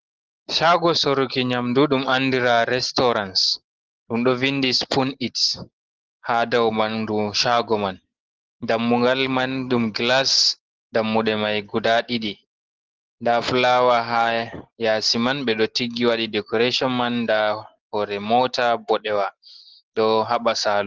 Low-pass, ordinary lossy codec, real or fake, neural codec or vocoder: 7.2 kHz; Opus, 16 kbps; real; none